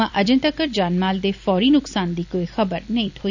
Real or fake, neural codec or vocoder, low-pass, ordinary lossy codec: fake; vocoder, 44.1 kHz, 128 mel bands every 256 samples, BigVGAN v2; 7.2 kHz; none